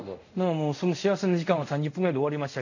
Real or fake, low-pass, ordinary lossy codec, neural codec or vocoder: fake; 7.2 kHz; none; codec, 24 kHz, 0.5 kbps, DualCodec